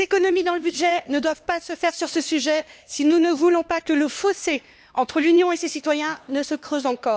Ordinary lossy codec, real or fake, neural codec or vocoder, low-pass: none; fake; codec, 16 kHz, 2 kbps, X-Codec, HuBERT features, trained on LibriSpeech; none